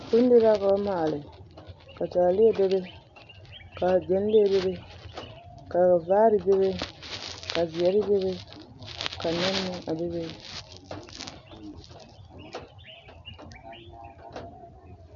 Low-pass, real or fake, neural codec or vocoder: 7.2 kHz; real; none